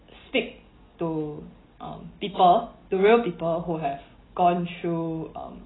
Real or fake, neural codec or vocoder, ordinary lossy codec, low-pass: real; none; AAC, 16 kbps; 7.2 kHz